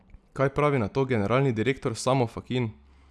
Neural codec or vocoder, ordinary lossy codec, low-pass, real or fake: none; none; none; real